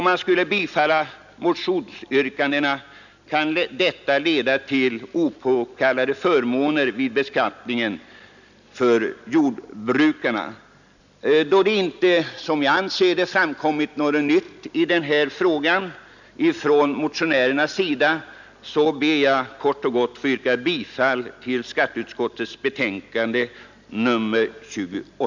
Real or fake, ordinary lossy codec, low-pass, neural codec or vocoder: real; none; 7.2 kHz; none